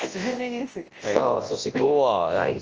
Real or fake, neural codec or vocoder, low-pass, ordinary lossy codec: fake; codec, 24 kHz, 0.9 kbps, WavTokenizer, large speech release; 7.2 kHz; Opus, 24 kbps